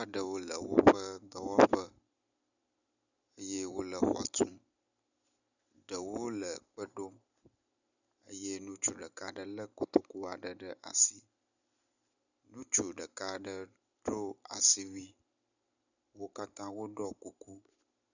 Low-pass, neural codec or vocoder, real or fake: 7.2 kHz; none; real